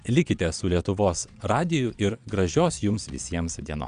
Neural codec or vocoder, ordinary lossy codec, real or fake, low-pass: vocoder, 22.05 kHz, 80 mel bands, Vocos; Opus, 64 kbps; fake; 9.9 kHz